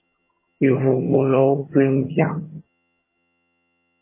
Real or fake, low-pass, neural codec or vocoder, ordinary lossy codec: fake; 3.6 kHz; vocoder, 22.05 kHz, 80 mel bands, HiFi-GAN; MP3, 16 kbps